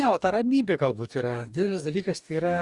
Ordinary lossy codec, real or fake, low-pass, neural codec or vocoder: Opus, 64 kbps; fake; 10.8 kHz; codec, 44.1 kHz, 2.6 kbps, DAC